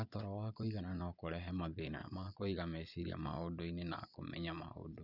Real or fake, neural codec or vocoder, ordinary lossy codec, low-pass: fake; vocoder, 22.05 kHz, 80 mel bands, Vocos; none; 5.4 kHz